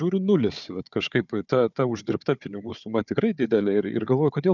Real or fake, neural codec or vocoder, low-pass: fake; codec, 16 kHz, 4 kbps, FunCodec, trained on Chinese and English, 50 frames a second; 7.2 kHz